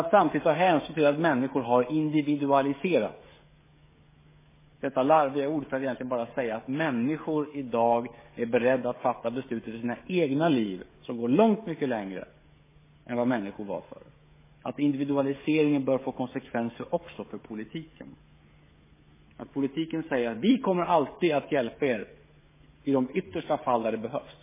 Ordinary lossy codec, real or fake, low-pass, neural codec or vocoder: MP3, 16 kbps; fake; 3.6 kHz; codec, 16 kHz, 16 kbps, FreqCodec, smaller model